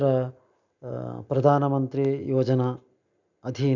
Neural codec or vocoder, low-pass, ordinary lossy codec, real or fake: none; 7.2 kHz; none; real